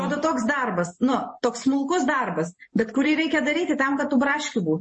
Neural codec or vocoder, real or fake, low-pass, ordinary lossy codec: vocoder, 44.1 kHz, 128 mel bands every 512 samples, BigVGAN v2; fake; 10.8 kHz; MP3, 32 kbps